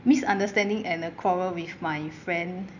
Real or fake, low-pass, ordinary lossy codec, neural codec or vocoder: real; 7.2 kHz; none; none